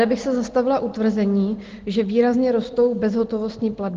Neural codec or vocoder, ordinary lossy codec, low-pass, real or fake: none; Opus, 16 kbps; 7.2 kHz; real